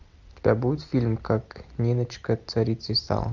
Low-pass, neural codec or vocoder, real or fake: 7.2 kHz; none; real